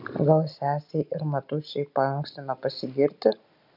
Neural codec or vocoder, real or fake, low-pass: none; real; 5.4 kHz